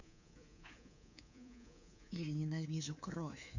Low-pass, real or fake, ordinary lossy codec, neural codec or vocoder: 7.2 kHz; fake; none; codec, 24 kHz, 3.1 kbps, DualCodec